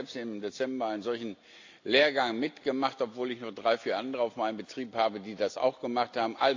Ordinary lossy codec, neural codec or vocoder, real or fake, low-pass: AAC, 48 kbps; none; real; 7.2 kHz